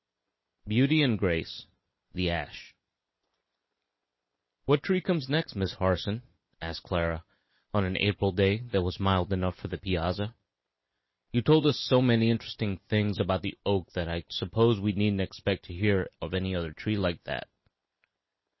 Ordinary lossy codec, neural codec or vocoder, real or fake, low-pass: MP3, 24 kbps; none; real; 7.2 kHz